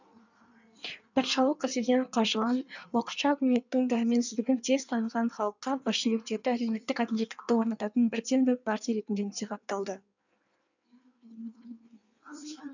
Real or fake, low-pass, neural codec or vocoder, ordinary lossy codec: fake; 7.2 kHz; codec, 16 kHz in and 24 kHz out, 1.1 kbps, FireRedTTS-2 codec; none